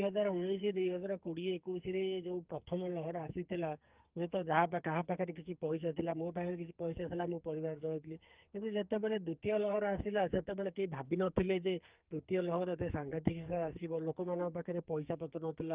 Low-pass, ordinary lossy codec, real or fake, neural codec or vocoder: 3.6 kHz; Opus, 24 kbps; fake; codec, 44.1 kHz, 2.6 kbps, SNAC